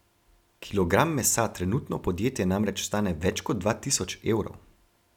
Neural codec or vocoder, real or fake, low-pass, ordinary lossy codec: none; real; 19.8 kHz; none